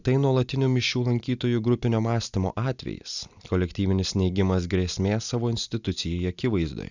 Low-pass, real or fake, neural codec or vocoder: 7.2 kHz; real; none